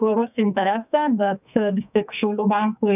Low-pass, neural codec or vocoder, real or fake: 3.6 kHz; codec, 44.1 kHz, 2.6 kbps, SNAC; fake